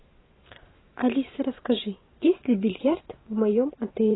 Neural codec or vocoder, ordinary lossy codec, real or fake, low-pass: vocoder, 44.1 kHz, 128 mel bands every 512 samples, BigVGAN v2; AAC, 16 kbps; fake; 7.2 kHz